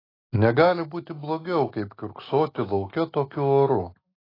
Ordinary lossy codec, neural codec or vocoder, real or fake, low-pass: AAC, 24 kbps; none; real; 5.4 kHz